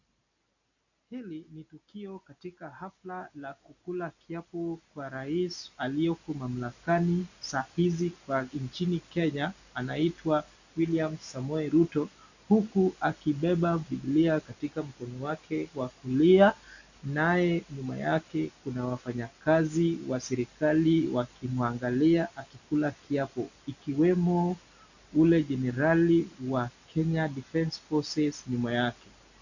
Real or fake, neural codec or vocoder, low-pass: real; none; 7.2 kHz